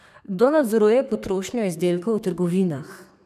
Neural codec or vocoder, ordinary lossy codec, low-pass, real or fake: codec, 32 kHz, 1.9 kbps, SNAC; none; 14.4 kHz; fake